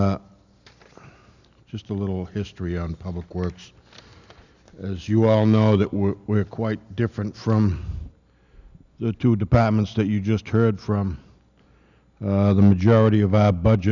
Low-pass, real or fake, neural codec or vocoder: 7.2 kHz; real; none